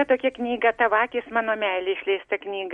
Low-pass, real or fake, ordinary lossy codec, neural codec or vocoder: 19.8 kHz; real; MP3, 48 kbps; none